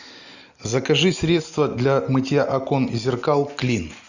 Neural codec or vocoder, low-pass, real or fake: none; 7.2 kHz; real